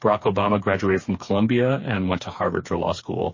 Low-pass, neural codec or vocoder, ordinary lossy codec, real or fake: 7.2 kHz; codec, 16 kHz, 4 kbps, FreqCodec, smaller model; MP3, 32 kbps; fake